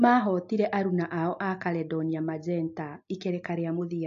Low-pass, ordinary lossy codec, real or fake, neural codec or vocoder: 7.2 kHz; AAC, 48 kbps; real; none